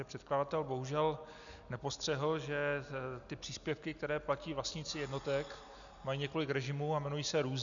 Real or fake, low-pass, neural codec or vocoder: real; 7.2 kHz; none